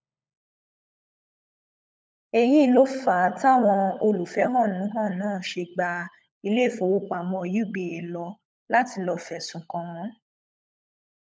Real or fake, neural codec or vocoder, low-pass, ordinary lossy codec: fake; codec, 16 kHz, 16 kbps, FunCodec, trained on LibriTTS, 50 frames a second; none; none